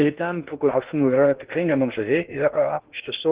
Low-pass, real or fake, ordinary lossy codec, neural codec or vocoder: 3.6 kHz; fake; Opus, 16 kbps; codec, 16 kHz in and 24 kHz out, 0.6 kbps, FocalCodec, streaming, 2048 codes